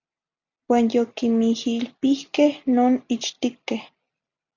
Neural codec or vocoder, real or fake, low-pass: none; real; 7.2 kHz